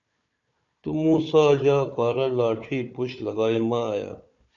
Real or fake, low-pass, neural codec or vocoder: fake; 7.2 kHz; codec, 16 kHz, 4 kbps, FunCodec, trained on Chinese and English, 50 frames a second